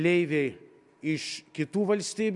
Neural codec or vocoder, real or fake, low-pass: none; real; 10.8 kHz